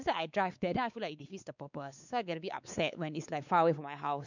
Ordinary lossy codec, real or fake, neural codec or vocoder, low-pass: none; fake; codec, 16 kHz, 4 kbps, X-Codec, WavLM features, trained on Multilingual LibriSpeech; 7.2 kHz